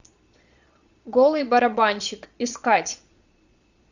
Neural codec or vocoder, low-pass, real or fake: vocoder, 22.05 kHz, 80 mel bands, Vocos; 7.2 kHz; fake